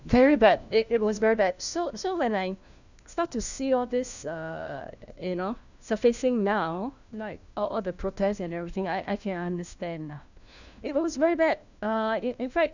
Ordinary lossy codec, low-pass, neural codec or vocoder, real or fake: none; 7.2 kHz; codec, 16 kHz, 1 kbps, FunCodec, trained on LibriTTS, 50 frames a second; fake